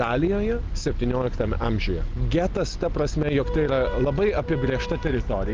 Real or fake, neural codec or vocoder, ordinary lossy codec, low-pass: real; none; Opus, 32 kbps; 7.2 kHz